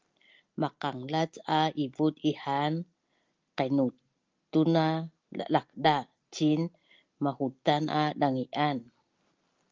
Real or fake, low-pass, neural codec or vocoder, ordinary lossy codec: real; 7.2 kHz; none; Opus, 32 kbps